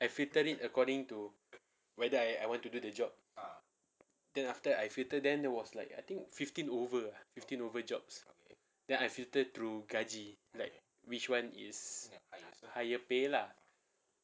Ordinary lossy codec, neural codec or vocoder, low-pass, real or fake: none; none; none; real